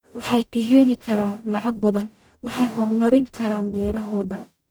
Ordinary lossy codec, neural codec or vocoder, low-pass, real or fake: none; codec, 44.1 kHz, 0.9 kbps, DAC; none; fake